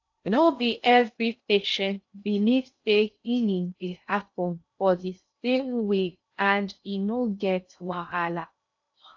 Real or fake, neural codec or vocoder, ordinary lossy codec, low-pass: fake; codec, 16 kHz in and 24 kHz out, 0.6 kbps, FocalCodec, streaming, 2048 codes; AAC, 48 kbps; 7.2 kHz